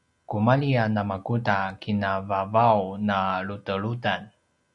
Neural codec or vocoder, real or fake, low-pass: none; real; 10.8 kHz